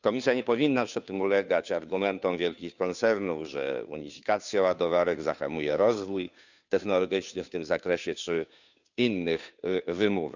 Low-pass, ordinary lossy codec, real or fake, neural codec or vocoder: 7.2 kHz; none; fake; codec, 16 kHz, 2 kbps, FunCodec, trained on Chinese and English, 25 frames a second